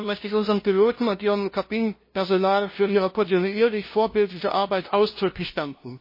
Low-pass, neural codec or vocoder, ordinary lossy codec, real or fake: 5.4 kHz; codec, 16 kHz, 0.5 kbps, FunCodec, trained on LibriTTS, 25 frames a second; MP3, 24 kbps; fake